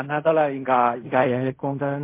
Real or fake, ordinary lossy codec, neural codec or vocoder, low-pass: fake; MP3, 24 kbps; codec, 16 kHz in and 24 kHz out, 0.4 kbps, LongCat-Audio-Codec, fine tuned four codebook decoder; 3.6 kHz